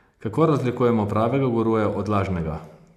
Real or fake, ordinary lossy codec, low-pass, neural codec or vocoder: real; none; 14.4 kHz; none